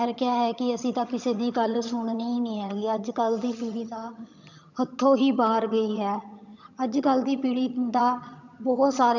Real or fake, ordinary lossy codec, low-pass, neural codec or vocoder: fake; none; 7.2 kHz; vocoder, 22.05 kHz, 80 mel bands, HiFi-GAN